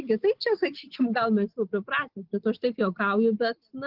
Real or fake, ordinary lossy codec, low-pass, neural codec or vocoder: real; Opus, 16 kbps; 5.4 kHz; none